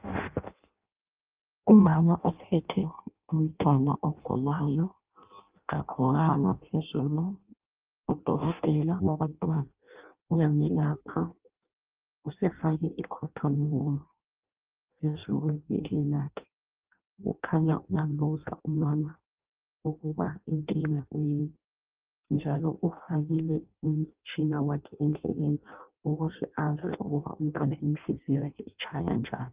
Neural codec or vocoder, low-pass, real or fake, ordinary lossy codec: codec, 16 kHz in and 24 kHz out, 0.6 kbps, FireRedTTS-2 codec; 3.6 kHz; fake; Opus, 32 kbps